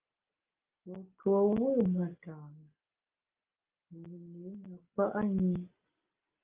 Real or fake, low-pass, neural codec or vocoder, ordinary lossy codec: real; 3.6 kHz; none; Opus, 24 kbps